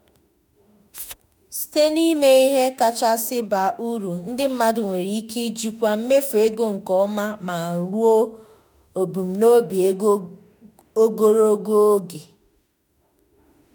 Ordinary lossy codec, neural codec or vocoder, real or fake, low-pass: none; autoencoder, 48 kHz, 32 numbers a frame, DAC-VAE, trained on Japanese speech; fake; none